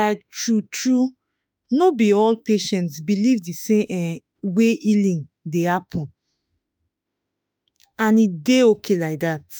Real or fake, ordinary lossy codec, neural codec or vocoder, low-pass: fake; none; autoencoder, 48 kHz, 32 numbers a frame, DAC-VAE, trained on Japanese speech; none